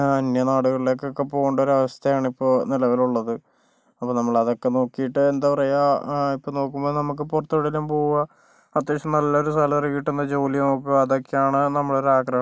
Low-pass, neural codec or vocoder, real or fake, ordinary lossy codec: none; none; real; none